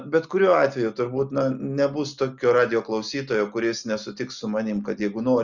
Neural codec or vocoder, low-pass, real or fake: none; 7.2 kHz; real